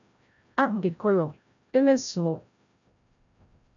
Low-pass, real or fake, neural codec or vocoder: 7.2 kHz; fake; codec, 16 kHz, 0.5 kbps, FreqCodec, larger model